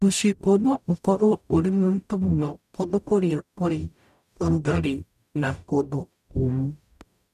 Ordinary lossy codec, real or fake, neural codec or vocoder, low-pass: none; fake; codec, 44.1 kHz, 0.9 kbps, DAC; 14.4 kHz